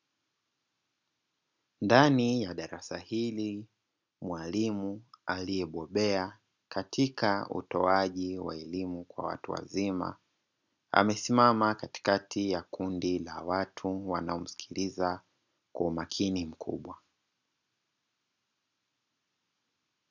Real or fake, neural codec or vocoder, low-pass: real; none; 7.2 kHz